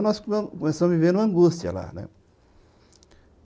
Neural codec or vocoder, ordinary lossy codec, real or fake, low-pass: none; none; real; none